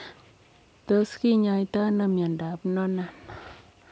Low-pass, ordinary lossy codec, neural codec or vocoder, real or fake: none; none; none; real